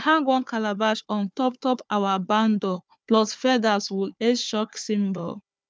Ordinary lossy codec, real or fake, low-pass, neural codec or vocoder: none; fake; none; codec, 16 kHz, 4 kbps, FunCodec, trained on Chinese and English, 50 frames a second